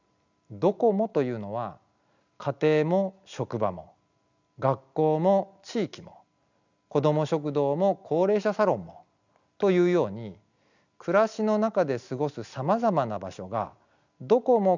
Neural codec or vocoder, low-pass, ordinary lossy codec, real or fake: none; 7.2 kHz; none; real